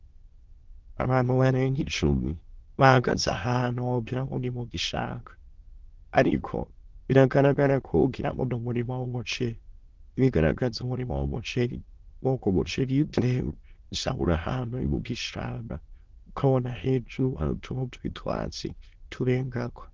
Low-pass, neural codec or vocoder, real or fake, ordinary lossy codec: 7.2 kHz; autoencoder, 22.05 kHz, a latent of 192 numbers a frame, VITS, trained on many speakers; fake; Opus, 16 kbps